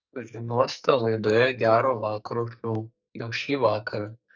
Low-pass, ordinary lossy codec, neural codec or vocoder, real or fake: 7.2 kHz; MP3, 64 kbps; codec, 44.1 kHz, 2.6 kbps, SNAC; fake